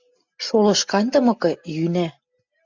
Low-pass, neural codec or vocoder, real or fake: 7.2 kHz; none; real